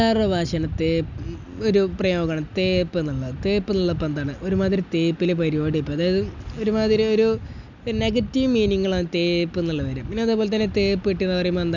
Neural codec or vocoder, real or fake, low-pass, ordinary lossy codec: none; real; 7.2 kHz; none